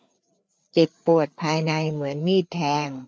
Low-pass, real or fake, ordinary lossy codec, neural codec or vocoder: none; fake; none; codec, 16 kHz, 4 kbps, FreqCodec, larger model